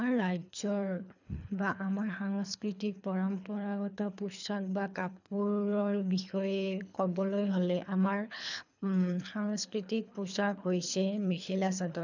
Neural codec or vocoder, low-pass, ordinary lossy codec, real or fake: codec, 24 kHz, 3 kbps, HILCodec; 7.2 kHz; none; fake